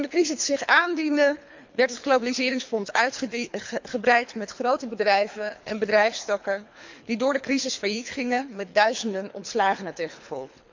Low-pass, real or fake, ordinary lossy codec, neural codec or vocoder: 7.2 kHz; fake; MP3, 64 kbps; codec, 24 kHz, 3 kbps, HILCodec